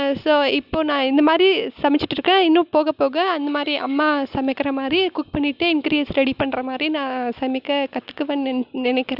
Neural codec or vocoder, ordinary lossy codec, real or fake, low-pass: none; Opus, 64 kbps; real; 5.4 kHz